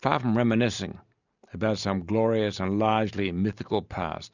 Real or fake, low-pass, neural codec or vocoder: real; 7.2 kHz; none